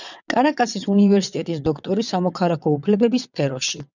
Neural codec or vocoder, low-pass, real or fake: vocoder, 22.05 kHz, 80 mel bands, WaveNeXt; 7.2 kHz; fake